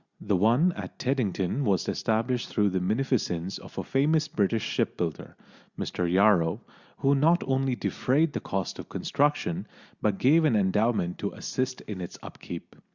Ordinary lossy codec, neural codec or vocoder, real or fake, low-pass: Opus, 64 kbps; none; real; 7.2 kHz